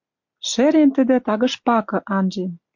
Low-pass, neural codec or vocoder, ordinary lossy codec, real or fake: 7.2 kHz; none; MP3, 48 kbps; real